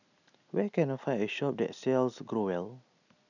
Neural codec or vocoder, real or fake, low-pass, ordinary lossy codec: none; real; 7.2 kHz; none